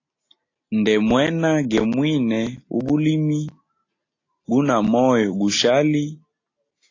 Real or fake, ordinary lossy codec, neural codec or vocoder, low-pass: real; AAC, 32 kbps; none; 7.2 kHz